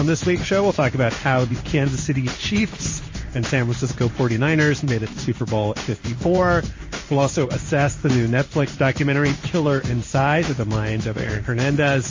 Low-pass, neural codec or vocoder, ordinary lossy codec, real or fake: 7.2 kHz; codec, 16 kHz in and 24 kHz out, 1 kbps, XY-Tokenizer; MP3, 32 kbps; fake